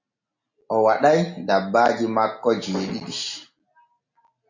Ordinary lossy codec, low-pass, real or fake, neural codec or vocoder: MP3, 48 kbps; 7.2 kHz; real; none